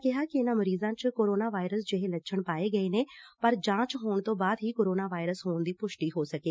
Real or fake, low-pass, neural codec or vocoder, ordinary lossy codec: real; none; none; none